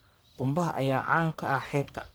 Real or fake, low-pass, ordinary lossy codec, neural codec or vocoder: fake; none; none; codec, 44.1 kHz, 1.7 kbps, Pupu-Codec